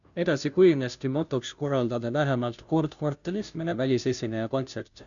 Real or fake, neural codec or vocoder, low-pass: fake; codec, 16 kHz, 0.5 kbps, FunCodec, trained on Chinese and English, 25 frames a second; 7.2 kHz